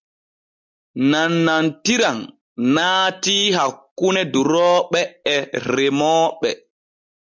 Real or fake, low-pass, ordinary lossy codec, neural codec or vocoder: real; 7.2 kHz; MP3, 64 kbps; none